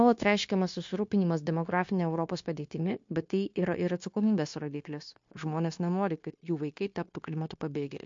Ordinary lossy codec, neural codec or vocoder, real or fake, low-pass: MP3, 48 kbps; codec, 16 kHz, 0.9 kbps, LongCat-Audio-Codec; fake; 7.2 kHz